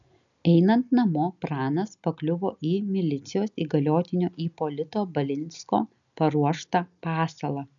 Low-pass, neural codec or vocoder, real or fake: 7.2 kHz; none; real